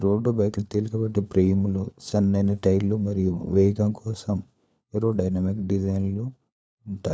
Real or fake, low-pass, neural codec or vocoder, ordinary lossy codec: fake; none; codec, 16 kHz, 4 kbps, FunCodec, trained on LibriTTS, 50 frames a second; none